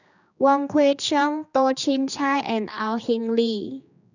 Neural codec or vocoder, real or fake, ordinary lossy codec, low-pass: codec, 16 kHz, 2 kbps, X-Codec, HuBERT features, trained on general audio; fake; none; 7.2 kHz